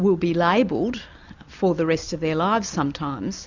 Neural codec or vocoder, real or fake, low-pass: none; real; 7.2 kHz